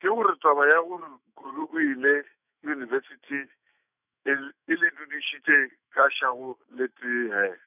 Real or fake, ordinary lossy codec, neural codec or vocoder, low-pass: fake; none; autoencoder, 48 kHz, 128 numbers a frame, DAC-VAE, trained on Japanese speech; 3.6 kHz